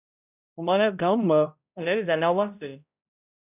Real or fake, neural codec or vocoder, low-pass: fake; codec, 16 kHz, 0.5 kbps, X-Codec, HuBERT features, trained on balanced general audio; 3.6 kHz